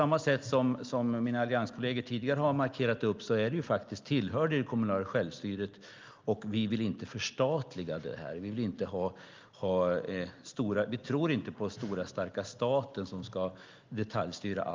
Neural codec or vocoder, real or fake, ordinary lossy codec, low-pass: none; real; Opus, 24 kbps; 7.2 kHz